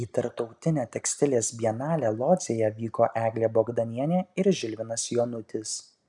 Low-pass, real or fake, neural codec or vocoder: 10.8 kHz; real; none